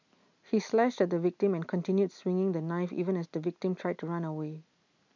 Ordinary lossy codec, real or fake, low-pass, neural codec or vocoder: MP3, 64 kbps; real; 7.2 kHz; none